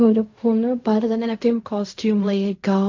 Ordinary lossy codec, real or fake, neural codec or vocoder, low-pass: AAC, 48 kbps; fake; codec, 16 kHz in and 24 kHz out, 0.4 kbps, LongCat-Audio-Codec, fine tuned four codebook decoder; 7.2 kHz